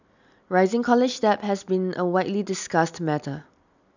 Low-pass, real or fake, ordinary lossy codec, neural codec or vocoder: 7.2 kHz; real; none; none